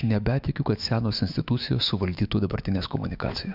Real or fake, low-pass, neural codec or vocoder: fake; 5.4 kHz; autoencoder, 48 kHz, 128 numbers a frame, DAC-VAE, trained on Japanese speech